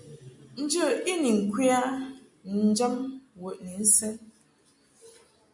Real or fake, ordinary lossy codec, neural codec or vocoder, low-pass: real; MP3, 64 kbps; none; 10.8 kHz